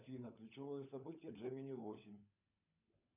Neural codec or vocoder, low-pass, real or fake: codec, 16 kHz, 16 kbps, FunCodec, trained on LibriTTS, 50 frames a second; 3.6 kHz; fake